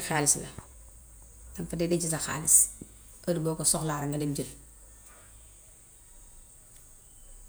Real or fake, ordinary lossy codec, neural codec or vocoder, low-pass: fake; none; vocoder, 48 kHz, 128 mel bands, Vocos; none